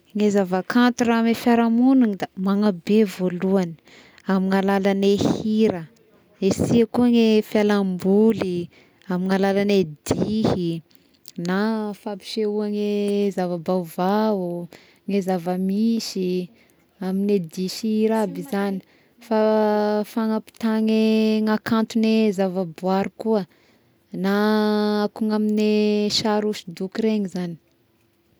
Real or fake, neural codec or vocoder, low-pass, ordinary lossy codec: real; none; none; none